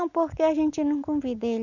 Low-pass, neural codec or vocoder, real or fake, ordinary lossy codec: 7.2 kHz; none; real; none